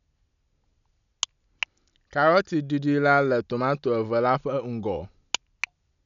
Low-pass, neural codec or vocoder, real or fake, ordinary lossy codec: 7.2 kHz; none; real; none